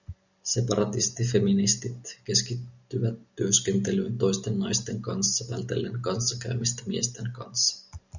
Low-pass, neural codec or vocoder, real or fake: 7.2 kHz; none; real